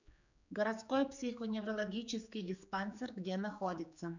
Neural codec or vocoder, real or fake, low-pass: codec, 16 kHz, 4 kbps, X-Codec, HuBERT features, trained on general audio; fake; 7.2 kHz